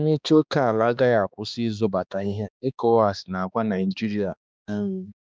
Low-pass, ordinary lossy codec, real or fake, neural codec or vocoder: none; none; fake; codec, 16 kHz, 2 kbps, X-Codec, HuBERT features, trained on balanced general audio